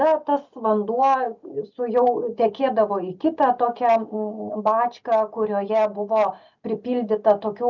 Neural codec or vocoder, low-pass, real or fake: none; 7.2 kHz; real